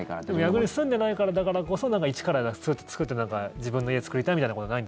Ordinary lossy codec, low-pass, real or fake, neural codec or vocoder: none; none; real; none